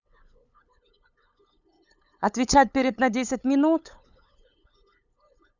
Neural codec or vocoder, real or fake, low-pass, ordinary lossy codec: codec, 16 kHz, 8 kbps, FunCodec, trained on LibriTTS, 25 frames a second; fake; 7.2 kHz; none